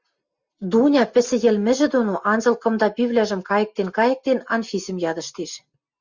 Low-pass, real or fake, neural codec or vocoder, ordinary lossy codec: 7.2 kHz; real; none; Opus, 64 kbps